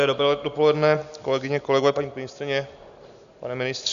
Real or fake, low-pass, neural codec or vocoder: real; 7.2 kHz; none